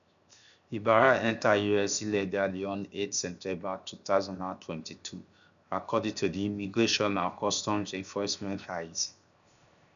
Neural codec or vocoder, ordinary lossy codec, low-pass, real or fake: codec, 16 kHz, 0.7 kbps, FocalCodec; none; 7.2 kHz; fake